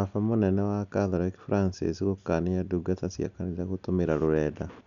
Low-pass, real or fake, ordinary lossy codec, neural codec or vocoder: 7.2 kHz; real; none; none